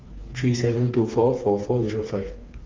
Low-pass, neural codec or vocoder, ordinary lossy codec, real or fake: 7.2 kHz; codec, 16 kHz, 4 kbps, FreqCodec, smaller model; Opus, 32 kbps; fake